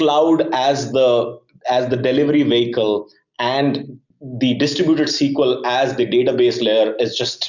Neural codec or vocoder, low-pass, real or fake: none; 7.2 kHz; real